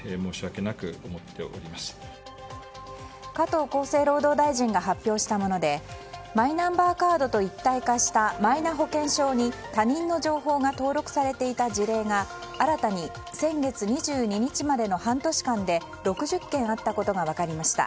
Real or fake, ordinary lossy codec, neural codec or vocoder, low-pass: real; none; none; none